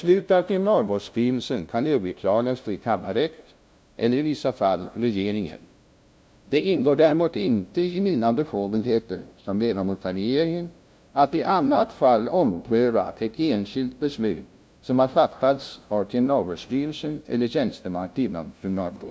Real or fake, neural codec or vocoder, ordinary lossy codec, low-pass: fake; codec, 16 kHz, 0.5 kbps, FunCodec, trained on LibriTTS, 25 frames a second; none; none